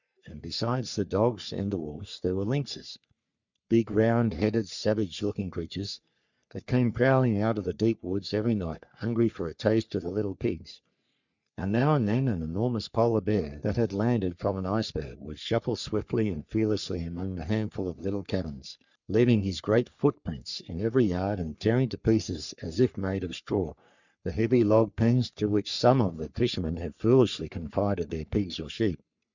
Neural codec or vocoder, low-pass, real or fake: codec, 44.1 kHz, 3.4 kbps, Pupu-Codec; 7.2 kHz; fake